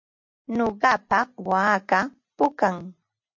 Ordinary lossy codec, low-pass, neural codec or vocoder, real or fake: MP3, 48 kbps; 7.2 kHz; none; real